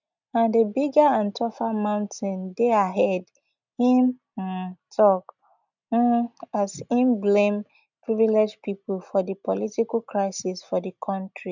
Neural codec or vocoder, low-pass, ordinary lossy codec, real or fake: none; 7.2 kHz; none; real